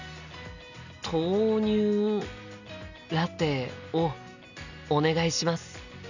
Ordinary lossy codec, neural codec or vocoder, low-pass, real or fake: none; none; 7.2 kHz; real